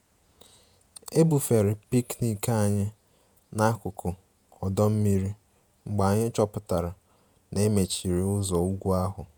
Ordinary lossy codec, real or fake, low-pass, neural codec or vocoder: none; fake; none; vocoder, 48 kHz, 128 mel bands, Vocos